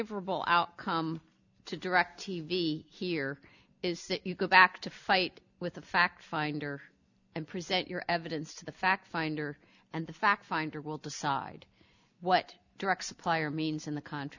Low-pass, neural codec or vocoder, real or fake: 7.2 kHz; none; real